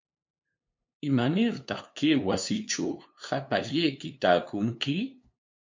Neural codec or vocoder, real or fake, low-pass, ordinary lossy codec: codec, 16 kHz, 2 kbps, FunCodec, trained on LibriTTS, 25 frames a second; fake; 7.2 kHz; MP3, 48 kbps